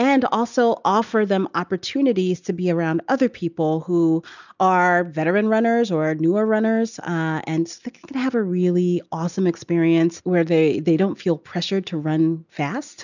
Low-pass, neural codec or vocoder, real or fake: 7.2 kHz; none; real